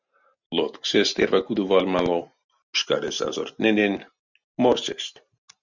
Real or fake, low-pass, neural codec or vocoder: real; 7.2 kHz; none